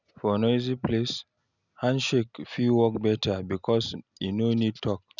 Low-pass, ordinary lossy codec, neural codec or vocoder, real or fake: 7.2 kHz; none; none; real